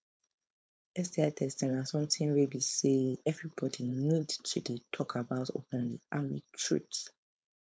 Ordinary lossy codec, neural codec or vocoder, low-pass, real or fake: none; codec, 16 kHz, 4.8 kbps, FACodec; none; fake